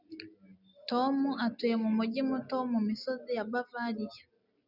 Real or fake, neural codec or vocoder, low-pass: real; none; 5.4 kHz